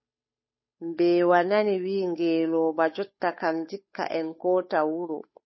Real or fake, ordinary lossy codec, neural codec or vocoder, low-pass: fake; MP3, 24 kbps; codec, 16 kHz, 8 kbps, FunCodec, trained on Chinese and English, 25 frames a second; 7.2 kHz